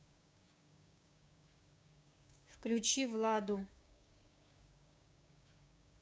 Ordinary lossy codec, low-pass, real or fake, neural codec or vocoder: none; none; fake; codec, 16 kHz, 6 kbps, DAC